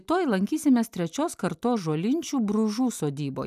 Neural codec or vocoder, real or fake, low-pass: none; real; 14.4 kHz